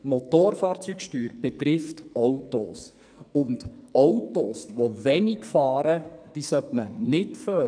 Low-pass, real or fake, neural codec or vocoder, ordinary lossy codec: 9.9 kHz; fake; codec, 44.1 kHz, 2.6 kbps, SNAC; none